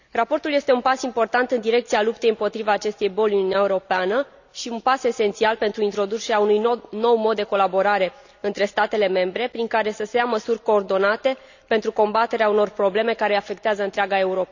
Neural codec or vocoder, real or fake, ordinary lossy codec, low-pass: none; real; none; 7.2 kHz